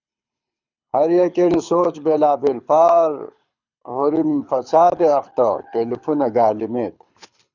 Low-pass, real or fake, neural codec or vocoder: 7.2 kHz; fake; codec, 24 kHz, 6 kbps, HILCodec